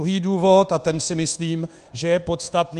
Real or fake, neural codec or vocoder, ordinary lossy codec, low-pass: fake; codec, 24 kHz, 1.2 kbps, DualCodec; Opus, 32 kbps; 10.8 kHz